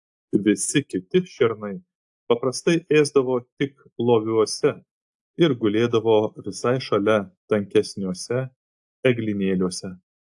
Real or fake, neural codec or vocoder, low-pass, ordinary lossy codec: real; none; 10.8 kHz; AAC, 64 kbps